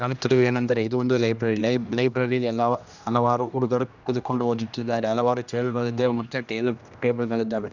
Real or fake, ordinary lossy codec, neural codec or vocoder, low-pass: fake; none; codec, 16 kHz, 1 kbps, X-Codec, HuBERT features, trained on general audio; 7.2 kHz